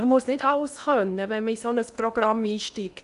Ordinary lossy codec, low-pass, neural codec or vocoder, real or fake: none; 10.8 kHz; codec, 16 kHz in and 24 kHz out, 0.8 kbps, FocalCodec, streaming, 65536 codes; fake